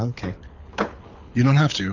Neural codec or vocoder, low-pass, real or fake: codec, 24 kHz, 6 kbps, HILCodec; 7.2 kHz; fake